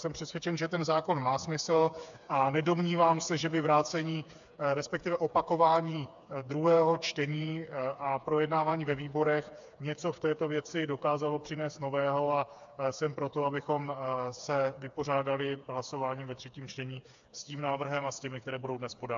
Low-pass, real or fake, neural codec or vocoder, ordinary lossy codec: 7.2 kHz; fake; codec, 16 kHz, 4 kbps, FreqCodec, smaller model; AAC, 64 kbps